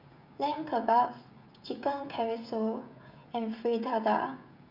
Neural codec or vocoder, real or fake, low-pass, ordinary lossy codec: codec, 16 kHz, 16 kbps, FreqCodec, smaller model; fake; 5.4 kHz; none